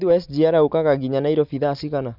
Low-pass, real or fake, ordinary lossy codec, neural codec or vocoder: 5.4 kHz; real; none; none